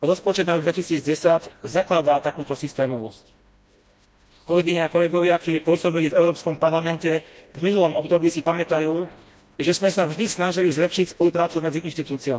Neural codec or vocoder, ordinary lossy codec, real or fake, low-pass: codec, 16 kHz, 1 kbps, FreqCodec, smaller model; none; fake; none